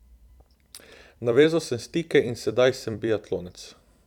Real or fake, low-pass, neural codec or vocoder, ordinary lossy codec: fake; 19.8 kHz; vocoder, 44.1 kHz, 128 mel bands every 256 samples, BigVGAN v2; none